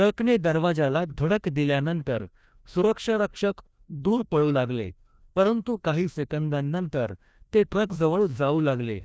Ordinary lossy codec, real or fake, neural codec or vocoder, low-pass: none; fake; codec, 16 kHz, 1 kbps, FreqCodec, larger model; none